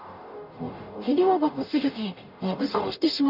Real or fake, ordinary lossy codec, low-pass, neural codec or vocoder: fake; AAC, 48 kbps; 5.4 kHz; codec, 44.1 kHz, 0.9 kbps, DAC